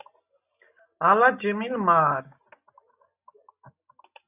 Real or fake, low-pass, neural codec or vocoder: real; 3.6 kHz; none